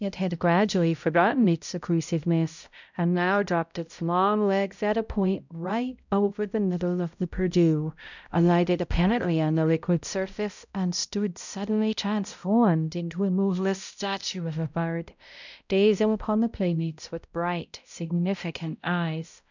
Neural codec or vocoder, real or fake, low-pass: codec, 16 kHz, 0.5 kbps, X-Codec, HuBERT features, trained on balanced general audio; fake; 7.2 kHz